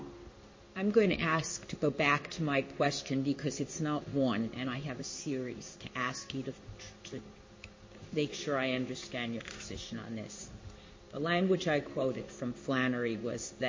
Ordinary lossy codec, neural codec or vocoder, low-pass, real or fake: MP3, 32 kbps; codec, 16 kHz in and 24 kHz out, 1 kbps, XY-Tokenizer; 7.2 kHz; fake